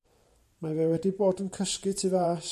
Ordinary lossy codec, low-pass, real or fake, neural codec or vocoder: MP3, 64 kbps; 14.4 kHz; fake; vocoder, 44.1 kHz, 128 mel bands every 512 samples, BigVGAN v2